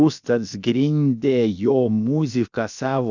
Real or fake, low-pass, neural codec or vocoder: fake; 7.2 kHz; codec, 16 kHz, about 1 kbps, DyCAST, with the encoder's durations